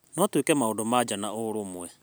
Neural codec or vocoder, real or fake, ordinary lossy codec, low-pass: none; real; none; none